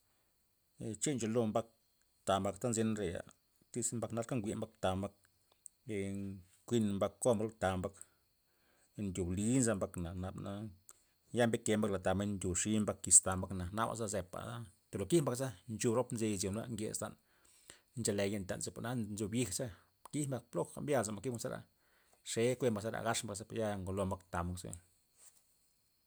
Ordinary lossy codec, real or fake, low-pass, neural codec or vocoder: none; real; none; none